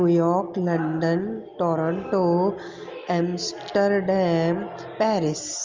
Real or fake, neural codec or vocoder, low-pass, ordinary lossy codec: real; none; 7.2 kHz; Opus, 32 kbps